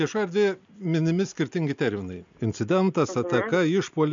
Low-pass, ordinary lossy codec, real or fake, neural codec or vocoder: 7.2 kHz; MP3, 64 kbps; real; none